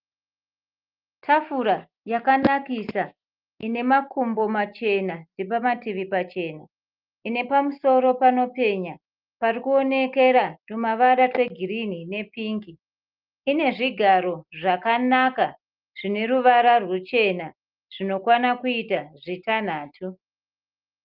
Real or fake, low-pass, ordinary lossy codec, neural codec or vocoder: real; 5.4 kHz; Opus, 32 kbps; none